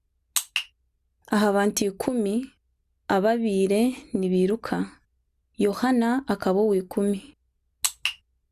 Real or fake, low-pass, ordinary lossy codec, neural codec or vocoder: real; 14.4 kHz; Opus, 64 kbps; none